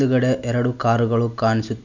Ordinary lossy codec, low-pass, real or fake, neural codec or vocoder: none; 7.2 kHz; real; none